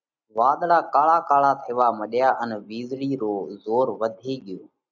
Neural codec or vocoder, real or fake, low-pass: none; real; 7.2 kHz